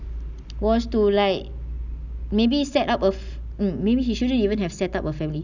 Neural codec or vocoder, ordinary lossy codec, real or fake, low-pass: none; none; real; 7.2 kHz